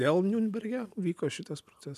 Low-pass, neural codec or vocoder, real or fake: 14.4 kHz; none; real